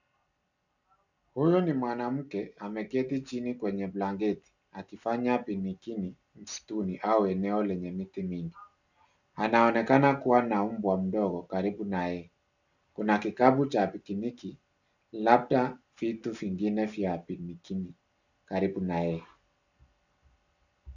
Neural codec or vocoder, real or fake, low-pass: none; real; 7.2 kHz